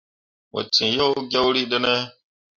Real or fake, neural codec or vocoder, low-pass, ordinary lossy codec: real; none; 7.2 kHz; Opus, 64 kbps